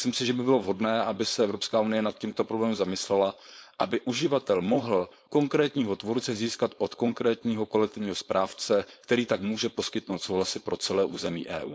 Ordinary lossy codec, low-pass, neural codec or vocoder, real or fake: none; none; codec, 16 kHz, 4.8 kbps, FACodec; fake